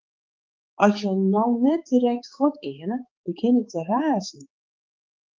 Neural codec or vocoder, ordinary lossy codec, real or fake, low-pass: codec, 16 kHz, 4 kbps, X-Codec, HuBERT features, trained on balanced general audio; Opus, 32 kbps; fake; 7.2 kHz